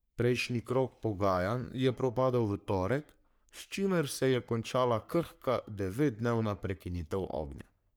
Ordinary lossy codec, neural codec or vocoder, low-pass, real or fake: none; codec, 44.1 kHz, 3.4 kbps, Pupu-Codec; none; fake